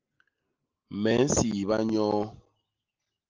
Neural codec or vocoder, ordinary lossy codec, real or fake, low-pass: none; Opus, 32 kbps; real; 7.2 kHz